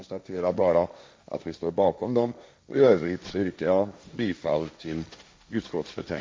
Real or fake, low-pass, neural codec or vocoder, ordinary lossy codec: fake; none; codec, 16 kHz, 1.1 kbps, Voila-Tokenizer; none